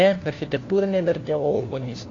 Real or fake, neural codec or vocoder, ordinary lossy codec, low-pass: fake; codec, 16 kHz, 1 kbps, FunCodec, trained on LibriTTS, 50 frames a second; AAC, 32 kbps; 7.2 kHz